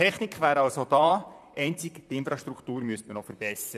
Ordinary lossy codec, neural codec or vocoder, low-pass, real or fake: none; vocoder, 44.1 kHz, 128 mel bands, Pupu-Vocoder; 14.4 kHz; fake